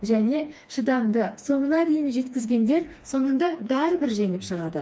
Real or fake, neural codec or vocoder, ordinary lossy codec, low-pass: fake; codec, 16 kHz, 2 kbps, FreqCodec, smaller model; none; none